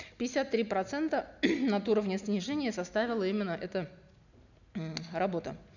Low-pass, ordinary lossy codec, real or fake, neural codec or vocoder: 7.2 kHz; none; real; none